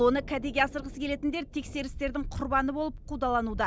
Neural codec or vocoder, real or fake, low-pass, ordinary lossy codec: none; real; none; none